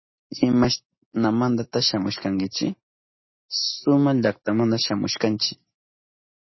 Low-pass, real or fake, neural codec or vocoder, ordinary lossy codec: 7.2 kHz; real; none; MP3, 24 kbps